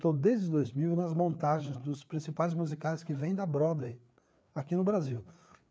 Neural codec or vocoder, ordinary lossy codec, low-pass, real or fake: codec, 16 kHz, 4 kbps, FreqCodec, larger model; none; none; fake